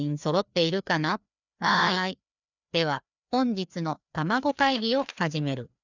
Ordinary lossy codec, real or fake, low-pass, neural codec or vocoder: none; fake; 7.2 kHz; codec, 16 kHz, 2 kbps, FreqCodec, larger model